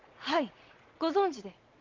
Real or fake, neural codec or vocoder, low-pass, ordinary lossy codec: fake; vocoder, 44.1 kHz, 80 mel bands, Vocos; 7.2 kHz; Opus, 24 kbps